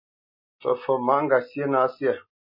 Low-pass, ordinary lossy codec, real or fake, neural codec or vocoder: 5.4 kHz; MP3, 32 kbps; real; none